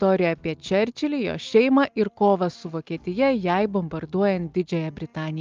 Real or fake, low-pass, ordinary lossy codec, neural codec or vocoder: real; 7.2 kHz; Opus, 32 kbps; none